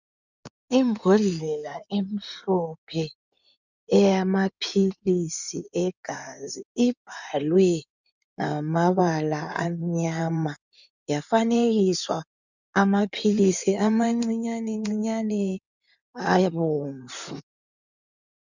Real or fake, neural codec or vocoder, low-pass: fake; codec, 16 kHz in and 24 kHz out, 2.2 kbps, FireRedTTS-2 codec; 7.2 kHz